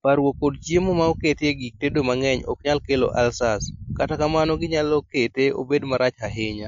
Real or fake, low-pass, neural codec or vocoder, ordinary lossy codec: real; 7.2 kHz; none; MP3, 48 kbps